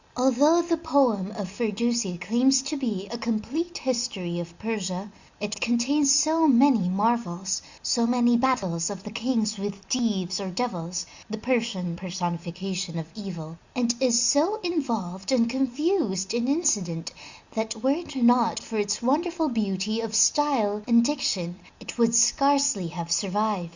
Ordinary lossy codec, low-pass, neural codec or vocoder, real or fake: Opus, 64 kbps; 7.2 kHz; none; real